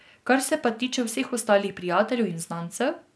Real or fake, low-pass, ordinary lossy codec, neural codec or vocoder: real; none; none; none